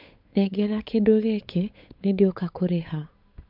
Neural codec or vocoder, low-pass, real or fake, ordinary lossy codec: codec, 16 kHz, 8 kbps, FunCodec, trained on Chinese and English, 25 frames a second; 5.4 kHz; fake; MP3, 48 kbps